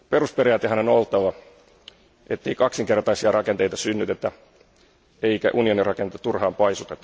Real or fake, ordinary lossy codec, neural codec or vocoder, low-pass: real; none; none; none